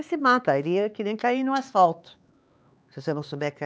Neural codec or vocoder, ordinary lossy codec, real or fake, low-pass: codec, 16 kHz, 4 kbps, X-Codec, HuBERT features, trained on LibriSpeech; none; fake; none